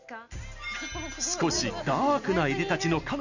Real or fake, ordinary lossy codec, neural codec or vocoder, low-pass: real; none; none; 7.2 kHz